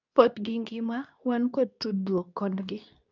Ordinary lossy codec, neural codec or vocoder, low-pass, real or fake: none; codec, 24 kHz, 0.9 kbps, WavTokenizer, medium speech release version 2; 7.2 kHz; fake